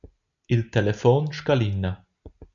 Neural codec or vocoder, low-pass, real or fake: none; 7.2 kHz; real